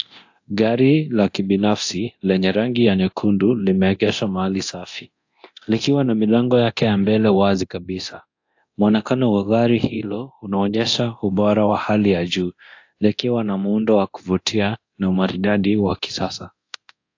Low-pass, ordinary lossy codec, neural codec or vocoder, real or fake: 7.2 kHz; AAC, 48 kbps; codec, 24 kHz, 0.9 kbps, DualCodec; fake